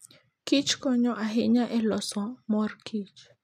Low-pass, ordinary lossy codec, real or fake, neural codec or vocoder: 14.4 kHz; MP3, 96 kbps; real; none